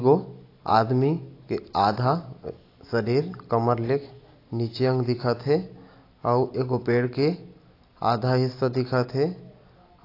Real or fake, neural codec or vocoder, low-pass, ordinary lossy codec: real; none; 5.4 kHz; AAC, 32 kbps